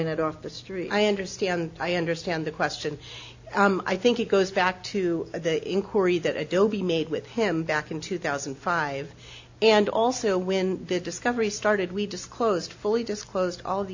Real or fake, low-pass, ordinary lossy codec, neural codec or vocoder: real; 7.2 kHz; AAC, 48 kbps; none